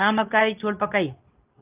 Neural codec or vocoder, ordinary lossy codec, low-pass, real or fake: codec, 24 kHz, 0.9 kbps, WavTokenizer, medium speech release version 2; Opus, 32 kbps; 3.6 kHz; fake